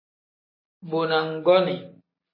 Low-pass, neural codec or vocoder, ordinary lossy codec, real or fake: 5.4 kHz; none; MP3, 24 kbps; real